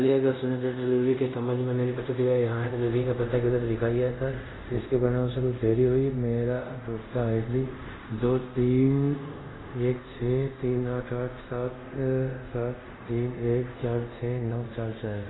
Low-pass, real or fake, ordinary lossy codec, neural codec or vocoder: 7.2 kHz; fake; AAC, 16 kbps; codec, 24 kHz, 0.5 kbps, DualCodec